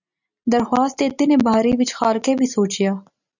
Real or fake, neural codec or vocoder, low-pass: real; none; 7.2 kHz